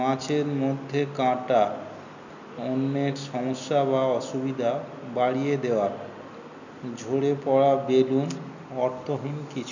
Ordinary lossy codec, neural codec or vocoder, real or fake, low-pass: none; none; real; 7.2 kHz